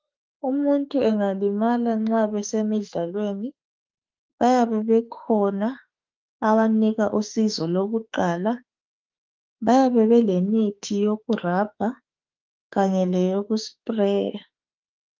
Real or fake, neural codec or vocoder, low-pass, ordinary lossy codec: fake; autoencoder, 48 kHz, 32 numbers a frame, DAC-VAE, trained on Japanese speech; 7.2 kHz; Opus, 32 kbps